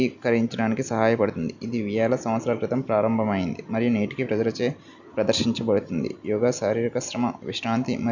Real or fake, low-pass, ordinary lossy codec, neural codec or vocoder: real; 7.2 kHz; none; none